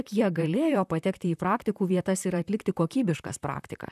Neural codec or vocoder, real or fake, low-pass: vocoder, 44.1 kHz, 128 mel bands, Pupu-Vocoder; fake; 14.4 kHz